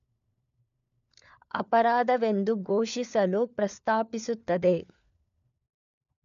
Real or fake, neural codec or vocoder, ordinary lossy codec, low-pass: fake; codec, 16 kHz, 4 kbps, FunCodec, trained on LibriTTS, 50 frames a second; none; 7.2 kHz